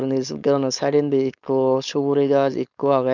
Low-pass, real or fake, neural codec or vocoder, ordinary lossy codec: 7.2 kHz; fake; codec, 16 kHz, 4.8 kbps, FACodec; none